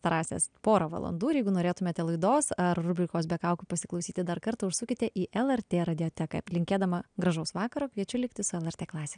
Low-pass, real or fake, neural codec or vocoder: 9.9 kHz; real; none